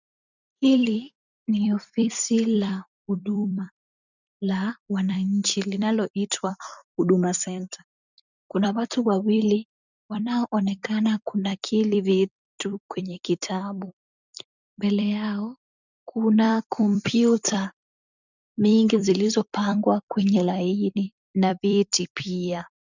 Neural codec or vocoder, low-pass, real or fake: vocoder, 44.1 kHz, 128 mel bands every 256 samples, BigVGAN v2; 7.2 kHz; fake